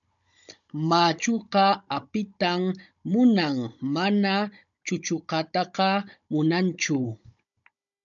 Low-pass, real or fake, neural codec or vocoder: 7.2 kHz; fake; codec, 16 kHz, 16 kbps, FunCodec, trained on Chinese and English, 50 frames a second